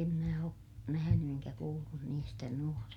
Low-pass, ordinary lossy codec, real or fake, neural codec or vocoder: 19.8 kHz; none; real; none